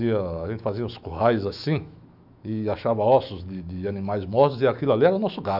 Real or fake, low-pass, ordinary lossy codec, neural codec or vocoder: fake; 5.4 kHz; none; autoencoder, 48 kHz, 128 numbers a frame, DAC-VAE, trained on Japanese speech